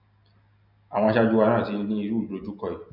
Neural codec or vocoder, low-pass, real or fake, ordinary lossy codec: none; 5.4 kHz; real; none